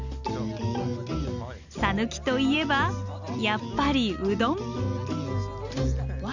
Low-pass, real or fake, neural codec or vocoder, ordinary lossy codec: 7.2 kHz; real; none; Opus, 64 kbps